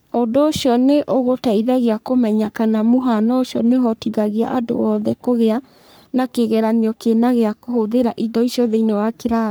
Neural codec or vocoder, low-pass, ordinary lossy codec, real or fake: codec, 44.1 kHz, 3.4 kbps, Pupu-Codec; none; none; fake